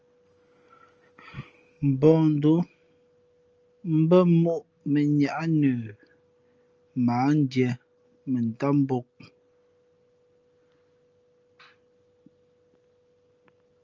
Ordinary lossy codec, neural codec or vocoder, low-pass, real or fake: Opus, 24 kbps; none; 7.2 kHz; real